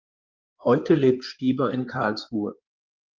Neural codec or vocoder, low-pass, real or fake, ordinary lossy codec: codec, 16 kHz in and 24 kHz out, 2.2 kbps, FireRedTTS-2 codec; 7.2 kHz; fake; Opus, 32 kbps